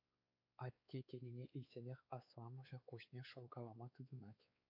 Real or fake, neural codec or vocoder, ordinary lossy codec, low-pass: fake; codec, 16 kHz, 4 kbps, X-Codec, WavLM features, trained on Multilingual LibriSpeech; AAC, 48 kbps; 5.4 kHz